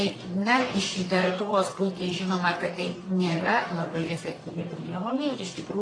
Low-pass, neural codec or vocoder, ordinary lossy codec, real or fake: 9.9 kHz; codec, 44.1 kHz, 1.7 kbps, Pupu-Codec; AAC, 32 kbps; fake